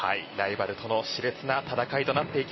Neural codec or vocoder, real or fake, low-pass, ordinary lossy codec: none; real; 7.2 kHz; MP3, 24 kbps